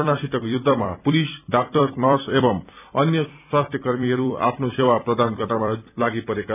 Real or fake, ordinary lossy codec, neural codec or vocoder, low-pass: fake; none; vocoder, 44.1 kHz, 128 mel bands every 256 samples, BigVGAN v2; 3.6 kHz